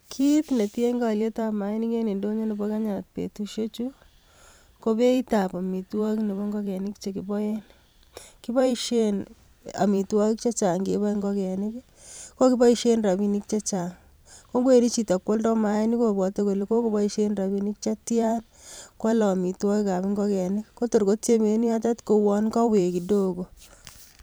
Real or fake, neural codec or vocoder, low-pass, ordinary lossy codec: fake; vocoder, 44.1 kHz, 128 mel bands every 512 samples, BigVGAN v2; none; none